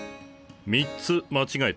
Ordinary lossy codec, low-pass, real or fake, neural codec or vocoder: none; none; real; none